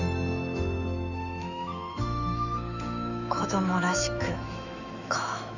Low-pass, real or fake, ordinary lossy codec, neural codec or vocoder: 7.2 kHz; fake; none; autoencoder, 48 kHz, 128 numbers a frame, DAC-VAE, trained on Japanese speech